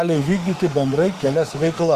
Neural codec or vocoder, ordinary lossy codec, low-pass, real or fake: autoencoder, 48 kHz, 32 numbers a frame, DAC-VAE, trained on Japanese speech; Opus, 32 kbps; 14.4 kHz; fake